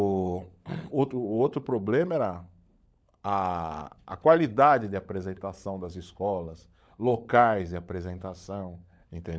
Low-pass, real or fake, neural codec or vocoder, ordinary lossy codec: none; fake; codec, 16 kHz, 16 kbps, FunCodec, trained on LibriTTS, 50 frames a second; none